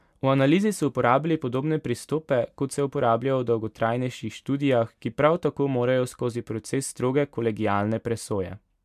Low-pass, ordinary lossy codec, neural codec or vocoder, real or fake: 14.4 kHz; MP3, 96 kbps; none; real